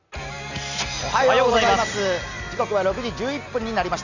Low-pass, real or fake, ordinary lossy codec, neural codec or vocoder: 7.2 kHz; real; none; none